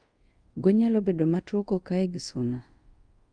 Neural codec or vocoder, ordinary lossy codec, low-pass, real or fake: codec, 24 kHz, 0.5 kbps, DualCodec; Opus, 32 kbps; 9.9 kHz; fake